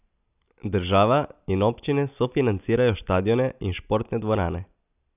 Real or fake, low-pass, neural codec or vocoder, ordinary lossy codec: real; 3.6 kHz; none; none